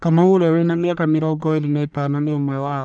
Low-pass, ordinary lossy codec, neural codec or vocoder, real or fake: 9.9 kHz; none; codec, 44.1 kHz, 1.7 kbps, Pupu-Codec; fake